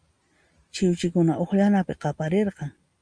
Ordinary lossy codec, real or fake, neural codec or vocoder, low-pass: Opus, 32 kbps; real; none; 9.9 kHz